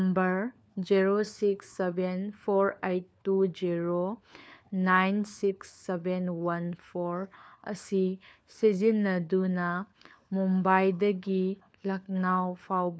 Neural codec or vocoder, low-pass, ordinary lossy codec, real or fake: codec, 16 kHz, 4 kbps, FunCodec, trained on LibriTTS, 50 frames a second; none; none; fake